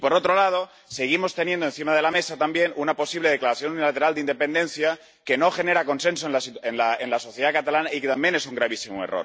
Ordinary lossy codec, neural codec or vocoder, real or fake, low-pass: none; none; real; none